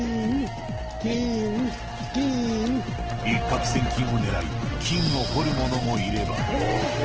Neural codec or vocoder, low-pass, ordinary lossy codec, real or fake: none; 7.2 kHz; Opus, 16 kbps; real